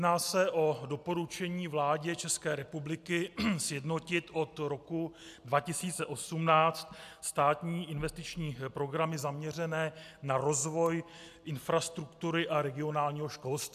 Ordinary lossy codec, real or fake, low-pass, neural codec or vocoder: AAC, 96 kbps; real; 14.4 kHz; none